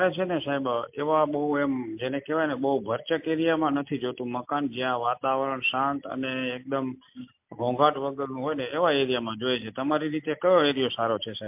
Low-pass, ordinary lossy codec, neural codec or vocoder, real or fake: 3.6 kHz; MP3, 32 kbps; none; real